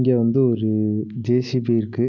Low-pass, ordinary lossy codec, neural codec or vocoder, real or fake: 7.2 kHz; none; none; real